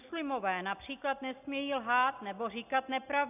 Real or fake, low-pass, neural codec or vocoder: real; 3.6 kHz; none